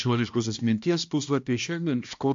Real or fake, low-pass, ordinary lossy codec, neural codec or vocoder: fake; 7.2 kHz; AAC, 64 kbps; codec, 16 kHz, 1 kbps, X-Codec, HuBERT features, trained on balanced general audio